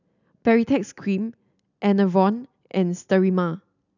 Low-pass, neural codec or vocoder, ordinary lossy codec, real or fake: 7.2 kHz; none; none; real